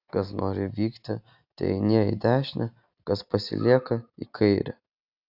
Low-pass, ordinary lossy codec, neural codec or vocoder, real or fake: 5.4 kHz; AAC, 48 kbps; none; real